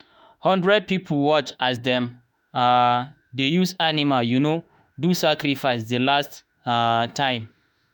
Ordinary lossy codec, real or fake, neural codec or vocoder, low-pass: none; fake; autoencoder, 48 kHz, 32 numbers a frame, DAC-VAE, trained on Japanese speech; none